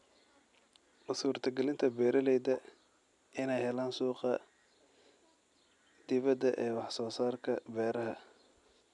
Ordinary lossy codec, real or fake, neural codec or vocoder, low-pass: none; real; none; 10.8 kHz